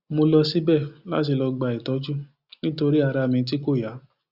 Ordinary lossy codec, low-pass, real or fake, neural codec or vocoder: Opus, 64 kbps; 5.4 kHz; real; none